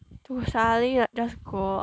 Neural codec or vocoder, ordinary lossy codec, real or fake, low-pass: none; none; real; none